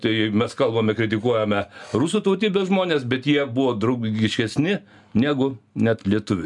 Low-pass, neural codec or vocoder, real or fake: 10.8 kHz; none; real